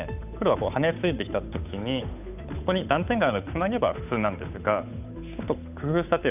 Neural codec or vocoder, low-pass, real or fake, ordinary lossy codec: codec, 16 kHz, 8 kbps, FunCodec, trained on Chinese and English, 25 frames a second; 3.6 kHz; fake; none